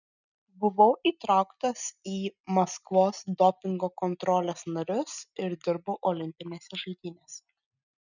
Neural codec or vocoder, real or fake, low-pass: none; real; 7.2 kHz